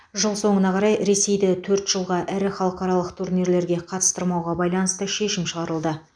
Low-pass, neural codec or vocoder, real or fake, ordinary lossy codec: 9.9 kHz; none; real; none